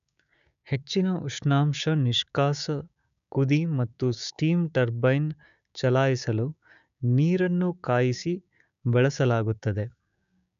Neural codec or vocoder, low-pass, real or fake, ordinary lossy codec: codec, 16 kHz, 6 kbps, DAC; 7.2 kHz; fake; none